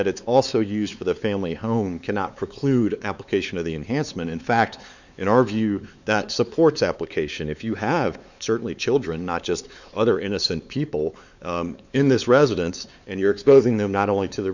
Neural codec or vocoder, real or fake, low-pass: codec, 16 kHz, 4 kbps, X-Codec, WavLM features, trained on Multilingual LibriSpeech; fake; 7.2 kHz